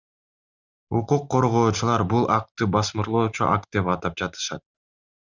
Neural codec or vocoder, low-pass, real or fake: none; 7.2 kHz; real